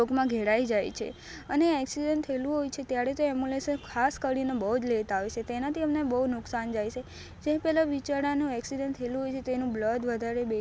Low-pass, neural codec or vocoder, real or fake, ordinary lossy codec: none; none; real; none